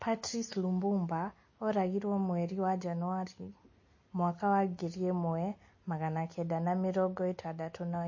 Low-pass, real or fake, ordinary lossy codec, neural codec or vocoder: 7.2 kHz; real; MP3, 32 kbps; none